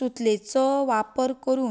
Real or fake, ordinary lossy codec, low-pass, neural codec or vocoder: real; none; none; none